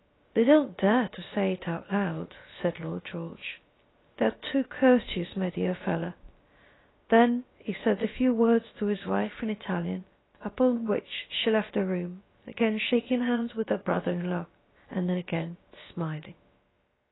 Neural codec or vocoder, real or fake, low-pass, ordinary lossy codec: codec, 16 kHz, about 1 kbps, DyCAST, with the encoder's durations; fake; 7.2 kHz; AAC, 16 kbps